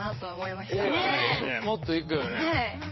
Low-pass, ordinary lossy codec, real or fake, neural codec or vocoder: 7.2 kHz; MP3, 24 kbps; fake; vocoder, 22.05 kHz, 80 mel bands, WaveNeXt